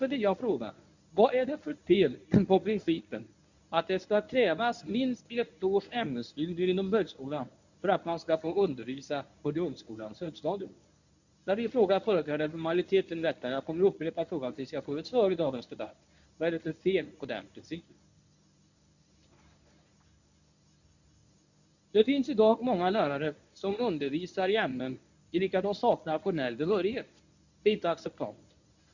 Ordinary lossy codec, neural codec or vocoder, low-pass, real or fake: none; codec, 24 kHz, 0.9 kbps, WavTokenizer, medium speech release version 1; 7.2 kHz; fake